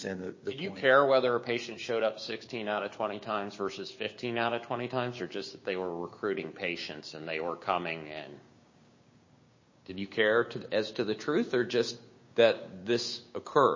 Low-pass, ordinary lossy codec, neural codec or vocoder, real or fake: 7.2 kHz; MP3, 32 kbps; codec, 16 kHz, 6 kbps, DAC; fake